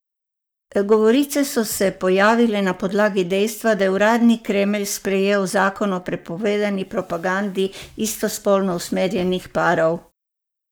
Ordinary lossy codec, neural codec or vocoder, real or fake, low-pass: none; codec, 44.1 kHz, 7.8 kbps, Pupu-Codec; fake; none